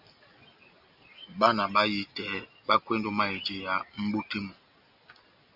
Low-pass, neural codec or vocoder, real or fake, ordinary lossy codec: 5.4 kHz; none; real; Opus, 64 kbps